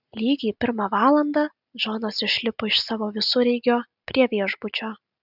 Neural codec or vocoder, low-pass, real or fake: none; 5.4 kHz; real